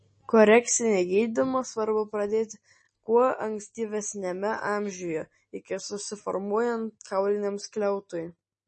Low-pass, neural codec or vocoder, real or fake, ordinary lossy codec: 10.8 kHz; none; real; MP3, 32 kbps